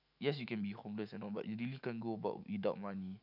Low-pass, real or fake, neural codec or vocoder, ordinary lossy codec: 5.4 kHz; fake; autoencoder, 48 kHz, 128 numbers a frame, DAC-VAE, trained on Japanese speech; none